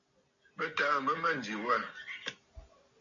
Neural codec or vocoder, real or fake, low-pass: none; real; 7.2 kHz